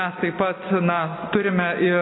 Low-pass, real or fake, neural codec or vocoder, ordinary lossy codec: 7.2 kHz; real; none; AAC, 16 kbps